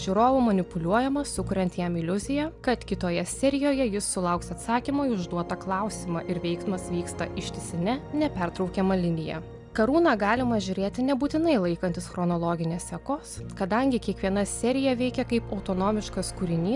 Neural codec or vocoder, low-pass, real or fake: none; 10.8 kHz; real